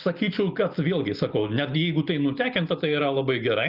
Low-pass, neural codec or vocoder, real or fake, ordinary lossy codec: 5.4 kHz; none; real; Opus, 24 kbps